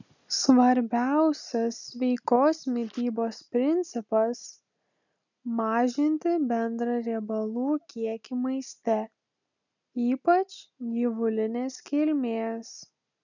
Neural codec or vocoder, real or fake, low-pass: none; real; 7.2 kHz